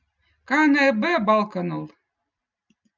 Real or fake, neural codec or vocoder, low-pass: real; none; 7.2 kHz